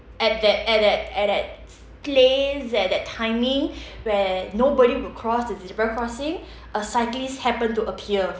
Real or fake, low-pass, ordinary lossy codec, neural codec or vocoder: real; none; none; none